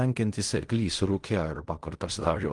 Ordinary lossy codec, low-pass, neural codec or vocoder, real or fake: Opus, 24 kbps; 10.8 kHz; codec, 16 kHz in and 24 kHz out, 0.4 kbps, LongCat-Audio-Codec, fine tuned four codebook decoder; fake